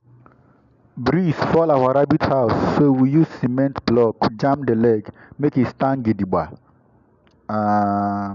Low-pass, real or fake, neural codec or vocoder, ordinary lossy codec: 7.2 kHz; real; none; none